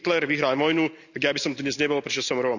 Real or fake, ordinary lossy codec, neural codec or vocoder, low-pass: real; none; none; 7.2 kHz